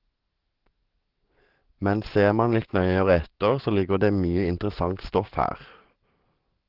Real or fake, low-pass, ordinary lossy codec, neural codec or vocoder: fake; 5.4 kHz; Opus, 16 kbps; codec, 44.1 kHz, 7.8 kbps, DAC